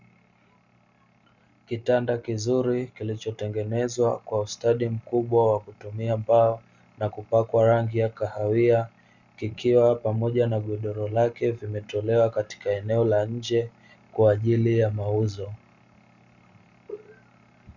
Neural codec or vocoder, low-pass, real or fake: none; 7.2 kHz; real